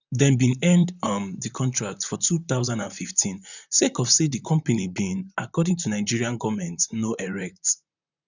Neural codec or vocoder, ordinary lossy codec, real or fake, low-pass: vocoder, 44.1 kHz, 128 mel bands, Pupu-Vocoder; none; fake; 7.2 kHz